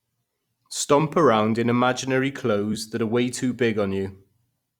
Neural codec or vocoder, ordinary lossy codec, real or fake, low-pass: vocoder, 44.1 kHz, 128 mel bands every 256 samples, BigVGAN v2; Opus, 64 kbps; fake; 19.8 kHz